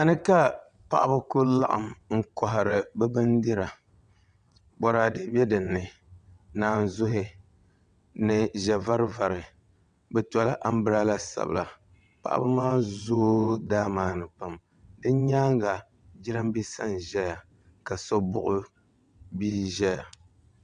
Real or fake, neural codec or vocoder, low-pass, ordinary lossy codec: fake; vocoder, 22.05 kHz, 80 mel bands, WaveNeXt; 9.9 kHz; MP3, 96 kbps